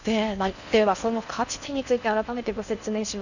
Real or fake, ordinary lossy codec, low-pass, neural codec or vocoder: fake; none; 7.2 kHz; codec, 16 kHz in and 24 kHz out, 0.6 kbps, FocalCodec, streaming, 4096 codes